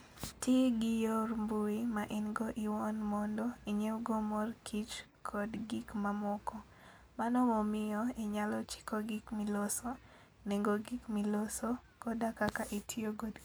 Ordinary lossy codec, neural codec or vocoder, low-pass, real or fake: none; none; none; real